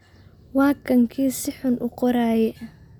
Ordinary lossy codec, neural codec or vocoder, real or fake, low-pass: none; none; real; 19.8 kHz